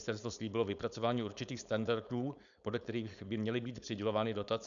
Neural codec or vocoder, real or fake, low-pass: codec, 16 kHz, 4.8 kbps, FACodec; fake; 7.2 kHz